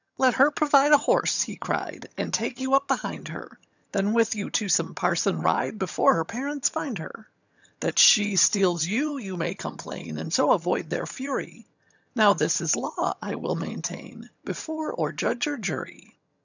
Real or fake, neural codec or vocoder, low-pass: fake; vocoder, 22.05 kHz, 80 mel bands, HiFi-GAN; 7.2 kHz